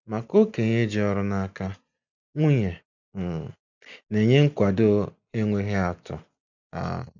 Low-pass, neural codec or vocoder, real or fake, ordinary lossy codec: 7.2 kHz; none; real; none